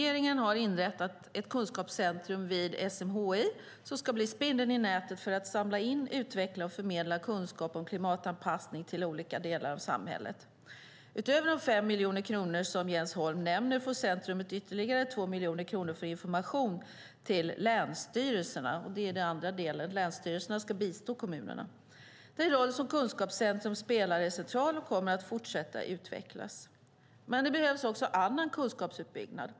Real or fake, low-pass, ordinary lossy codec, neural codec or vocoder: real; none; none; none